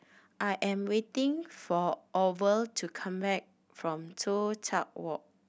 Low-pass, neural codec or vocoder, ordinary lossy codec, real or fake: none; none; none; real